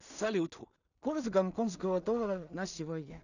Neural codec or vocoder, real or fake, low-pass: codec, 16 kHz in and 24 kHz out, 0.4 kbps, LongCat-Audio-Codec, two codebook decoder; fake; 7.2 kHz